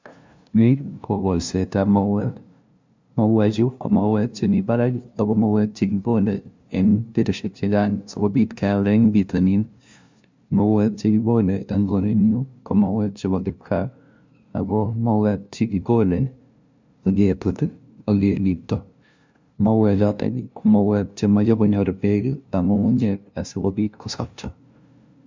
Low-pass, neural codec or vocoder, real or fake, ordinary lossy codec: 7.2 kHz; codec, 16 kHz, 0.5 kbps, FunCodec, trained on LibriTTS, 25 frames a second; fake; MP3, 64 kbps